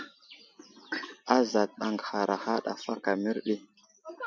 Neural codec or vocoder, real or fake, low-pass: none; real; 7.2 kHz